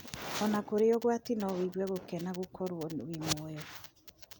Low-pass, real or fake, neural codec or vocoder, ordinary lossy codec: none; real; none; none